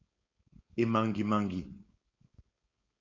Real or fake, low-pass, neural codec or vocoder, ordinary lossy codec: fake; 7.2 kHz; codec, 16 kHz, 4.8 kbps, FACodec; AAC, 32 kbps